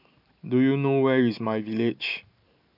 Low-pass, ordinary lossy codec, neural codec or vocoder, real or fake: 5.4 kHz; none; vocoder, 44.1 kHz, 128 mel bands every 512 samples, BigVGAN v2; fake